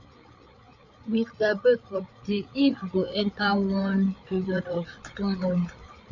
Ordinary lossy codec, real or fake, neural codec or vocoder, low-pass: none; fake; codec, 16 kHz, 8 kbps, FreqCodec, larger model; 7.2 kHz